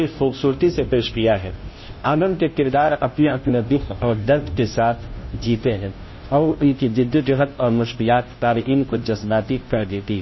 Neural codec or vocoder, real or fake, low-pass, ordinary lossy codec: codec, 16 kHz, 0.5 kbps, FunCodec, trained on Chinese and English, 25 frames a second; fake; 7.2 kHz; MP3, 24 kbps